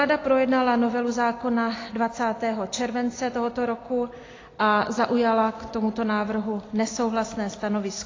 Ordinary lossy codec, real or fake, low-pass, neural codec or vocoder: AAC, 32 kbps; real; 7.2 kHz; none